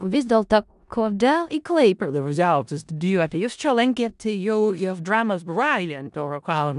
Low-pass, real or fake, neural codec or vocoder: 10.8 kHz; fake; codec, 16 kHz in and 24 kHz out, 0.4 kbps, LongCat-Audio-Codec, four codebook decoder